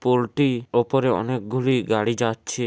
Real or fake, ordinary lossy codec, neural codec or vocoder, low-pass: real; none; none; none